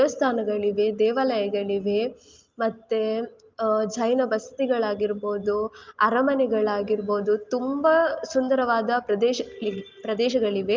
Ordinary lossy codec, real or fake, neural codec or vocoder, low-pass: Opus, 24 kbps; real; none; 7.2 kHz